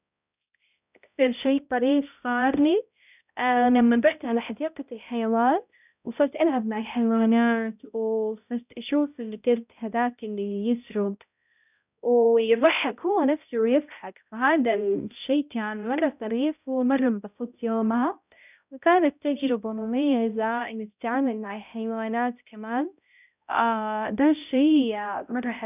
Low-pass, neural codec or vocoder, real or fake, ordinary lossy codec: 3.6 kHz; codec, 16 kHz, 0.5 kbps, X-Codec, HuBERT features, trained on balanced general audio; fake; none